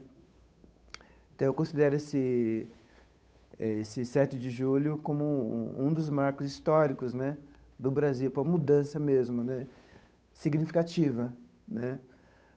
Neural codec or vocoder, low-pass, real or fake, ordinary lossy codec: codec, 16 kHz, 8 kbps, FunCodec, trained on Chinese and English, 25 frames a second; none; fake; none